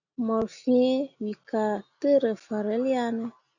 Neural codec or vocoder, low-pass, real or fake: none; 7.2 kHz; real